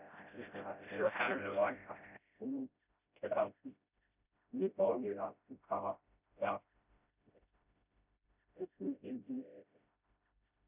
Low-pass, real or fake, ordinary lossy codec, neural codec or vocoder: 3.6 kHz; fake; none; codec, 16 kHz, 0.5 kbps, FreqCodec, smaller model